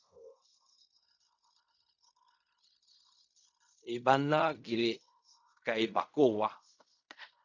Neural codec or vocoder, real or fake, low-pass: codec, 16 kHz in and 24 kHz out, 0.4 kbps, LongCat-Audio-Codec, fine tuned four codebook decoder; fake; 7.2 kHz